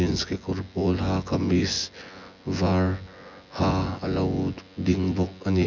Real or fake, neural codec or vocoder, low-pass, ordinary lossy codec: fake; vocoder, 24 kHz, 100 mel bands, Vocos; 7.2 kHz; none